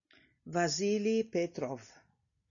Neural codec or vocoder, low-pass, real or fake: none; 7.2 kHz; real